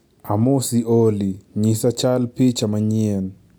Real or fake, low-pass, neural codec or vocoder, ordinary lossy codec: real; none; none; none